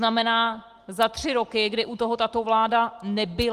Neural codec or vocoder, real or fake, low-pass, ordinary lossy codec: none; real; 14.4 kHz; Opus, 24 kbps